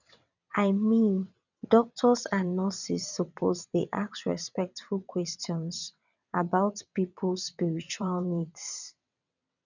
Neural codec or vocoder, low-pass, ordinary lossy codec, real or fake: vocoder, 22.05 kHz, 80 mel bands, WaveNeXt; 7.2 kHz; none; fake